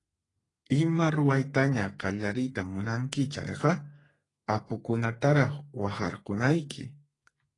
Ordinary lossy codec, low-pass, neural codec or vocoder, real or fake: AAC, 32 kbps; 10.8 kHz; codec, 32 kHz, 1.9 kbps, SNAC; fake